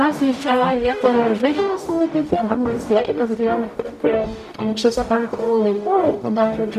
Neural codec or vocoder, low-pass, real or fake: codec, 44.1 kHz, 0.9 kbps, DAC; 14.4 kHz; fake